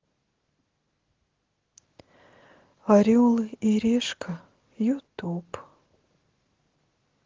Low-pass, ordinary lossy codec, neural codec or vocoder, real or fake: 7.2 kHz; Opus, 16 kbps; none; real